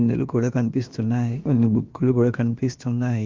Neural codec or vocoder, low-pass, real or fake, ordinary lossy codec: codec, 16 kHz, about 1 kbps, DyCAST, with the encoder's durations; 7.2 kHz; fake; Opus, 24 kbps